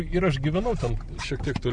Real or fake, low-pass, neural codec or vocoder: real; 9.9 kHz; none